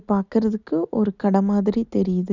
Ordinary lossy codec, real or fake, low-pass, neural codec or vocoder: none; real; 7.2 kHz; none